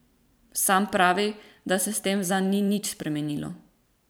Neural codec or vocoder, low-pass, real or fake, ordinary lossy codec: vocoder, 44.1 kHz, 128 mel bands every 512 samples, BigVGAN v2; none; fake; none